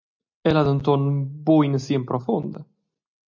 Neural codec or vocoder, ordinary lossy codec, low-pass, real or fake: none; MP3, 64 kbps; 7.2 kHz; real